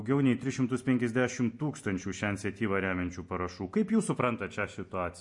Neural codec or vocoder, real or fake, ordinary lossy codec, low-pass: none; real; MP3, 48 kbps; 10.8 kHz